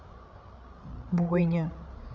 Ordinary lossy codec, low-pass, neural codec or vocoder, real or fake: none; none; codec, 16 kHz, 8 kbps, FreqCodec, larger model; fake